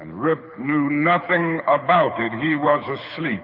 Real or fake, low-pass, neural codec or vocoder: fake; 5.4 kHz; codec, 24 kHz, 6 kbps, HILCodec